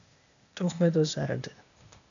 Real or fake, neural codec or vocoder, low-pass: fake; codec, 16 kHz, 0.8 kbps, ZipCodec; 7.2 kHz